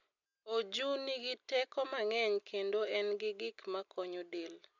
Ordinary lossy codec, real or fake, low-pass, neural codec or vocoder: none; real; 7.2 kHz; none